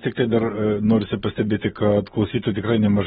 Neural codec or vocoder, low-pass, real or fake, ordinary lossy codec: none; 19.8 kHz; real; AAC, 16 kbps